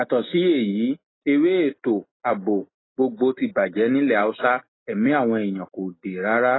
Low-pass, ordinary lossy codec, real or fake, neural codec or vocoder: 7.2 kHz; AAC, 16 kbps; real; none